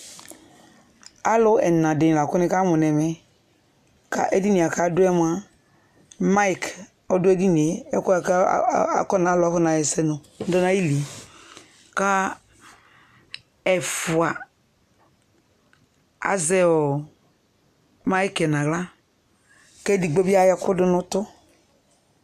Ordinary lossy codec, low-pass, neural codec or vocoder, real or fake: MP3, 96 kbps; 14.4 kHz; none; real